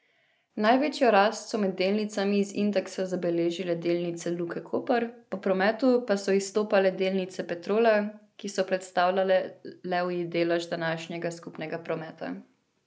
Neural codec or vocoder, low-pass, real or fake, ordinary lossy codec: none; none; real; none